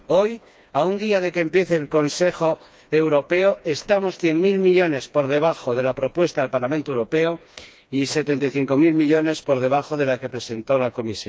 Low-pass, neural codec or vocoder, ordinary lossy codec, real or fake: none; codec, 16 kHz, 2 kbps, FreqCodec, smaller model; none; fake